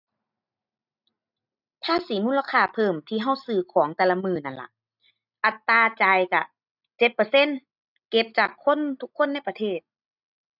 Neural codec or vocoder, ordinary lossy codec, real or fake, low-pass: none; none; real; 5.4 kHz